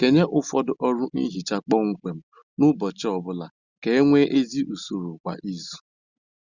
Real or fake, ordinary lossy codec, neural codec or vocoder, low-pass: real; Opus, 64 kbps; none; 7.2 kHz